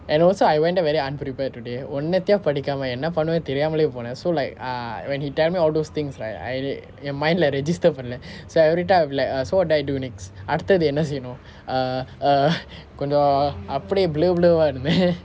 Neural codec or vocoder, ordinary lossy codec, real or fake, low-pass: none; none; real; none